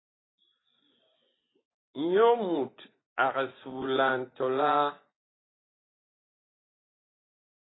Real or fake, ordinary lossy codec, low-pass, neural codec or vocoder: fake; AAC, 16 kbps; 7.2 kHz; vocoder, 24 kHz, 100 mel bands, Vocos